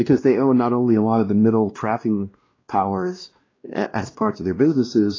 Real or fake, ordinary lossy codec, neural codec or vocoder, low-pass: fake; AAC, 32 kbps; codec, 16 kHz, 1 kbps, X-Codec, WavLM features, trained on Multilingual LibriSpeech; 7.2 kHz